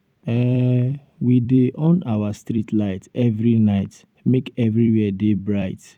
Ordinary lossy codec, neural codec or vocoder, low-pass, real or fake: none; vocoder, 44.1 kHz, 128 mel bands every 256 samples, BigVGAN v2; 19.8 kHz; fake